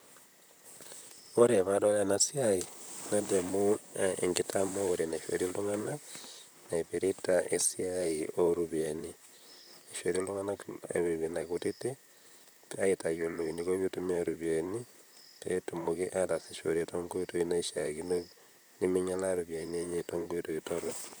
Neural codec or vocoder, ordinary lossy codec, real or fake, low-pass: vocoder, 44.1 kHz, 128 mel bands, Pupu-Vocoder; none; fake; none